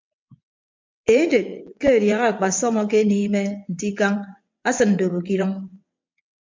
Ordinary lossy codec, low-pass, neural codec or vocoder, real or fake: MP3, 64 kbps; 7.2 kHz; vocoder, 22.05 kHz, 80 mel bands, WaveNeXt; fake